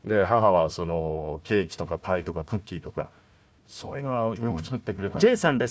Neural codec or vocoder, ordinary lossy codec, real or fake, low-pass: codec, 16 kHz, 1 kbps, FunCodec, trained on Chinese and English, 50 frames a second; none; fake; none